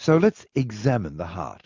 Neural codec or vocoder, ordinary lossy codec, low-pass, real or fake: none; MP3, 64 kbps; 7.2 kHz; real